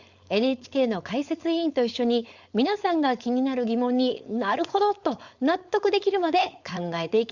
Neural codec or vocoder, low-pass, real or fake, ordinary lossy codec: codec, 16 kHz, 4.8 kbps, FACodec; 7.2 kHz; fake; Opus, 64 kbps